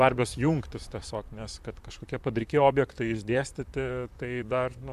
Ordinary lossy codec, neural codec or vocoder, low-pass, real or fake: AAC, 96 kbps; vocoder, 44.1 kHz, 128 mel bands, Pupu-Vocoder; 14.4 kHz; fake